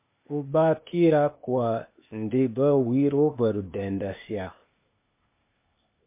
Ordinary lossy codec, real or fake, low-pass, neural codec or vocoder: MP3, 24 kbps; fake; 3.6 kHz; codec, 16 kHz, 0.8 kbps, ZipCodec